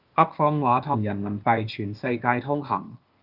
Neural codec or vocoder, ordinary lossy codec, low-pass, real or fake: codec, 16 kHz, 0.8 kbps, ZipCodec; Opus, 24 kbps; 5.4 kHz; fake